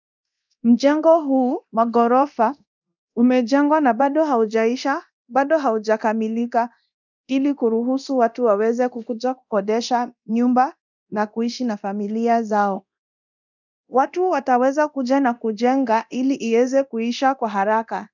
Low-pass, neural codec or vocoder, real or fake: 7.2 kHz; codec, 24 kHz, 0.9 kbps, DualCodec; fake